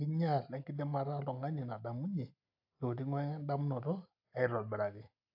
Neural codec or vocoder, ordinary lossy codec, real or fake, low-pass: none; AAC, 48 kbps; real; 5.4 kHz